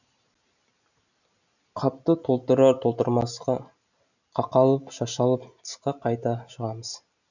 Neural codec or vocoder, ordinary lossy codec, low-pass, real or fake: none; none; 7.2 kHz; real